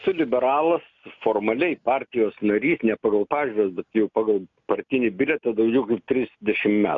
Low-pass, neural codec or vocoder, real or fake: 7.2 kHz; none; real